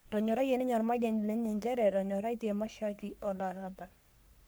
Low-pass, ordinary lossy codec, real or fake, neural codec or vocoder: none; none; fake; codec, 44.1 kHz, 3.4 kbps, Pupu-Codec